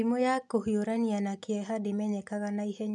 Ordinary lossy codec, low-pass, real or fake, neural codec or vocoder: none; 10.8 kHz; real; none